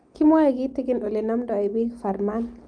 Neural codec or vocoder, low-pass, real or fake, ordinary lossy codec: none; 9.9 kHz; real; Opus, 32 kbps